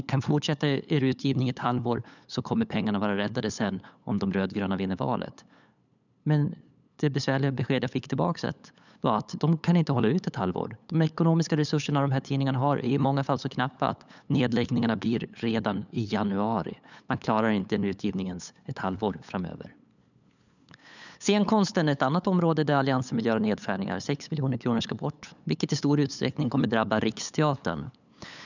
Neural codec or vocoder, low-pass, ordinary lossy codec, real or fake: codec, 16 kHz, 8 kbps, FunCodec, trained on LibriTTS, 25 frames a second; 7.2 kHz; none; fake